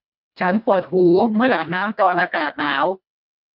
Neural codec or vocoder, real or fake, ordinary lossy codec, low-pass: codec, 24 kHz, 1.5 kbps, HILCodec; fake; none; 5.4 kHz